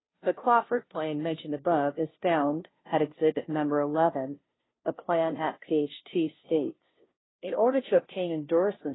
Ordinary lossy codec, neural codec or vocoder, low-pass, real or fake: AAC, 16 kbps; codec, 16 kHz, 0.5 kbps, FunCodec, trained on Chinese and English, 25 frames a second; 7.2 kHz; fake